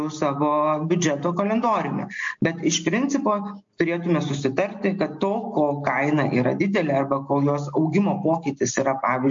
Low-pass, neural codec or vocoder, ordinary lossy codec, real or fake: 7.2 kHz; none; MP3, 48 kbps; real